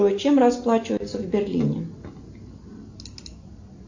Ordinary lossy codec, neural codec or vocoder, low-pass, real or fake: AAC, 48 kbps; none; 7.2 kHz; real